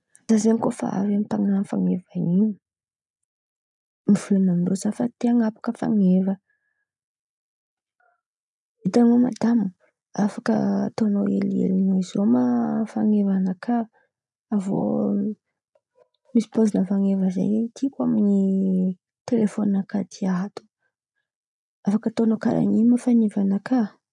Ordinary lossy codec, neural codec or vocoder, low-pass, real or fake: none; none; 10.8 kHz; real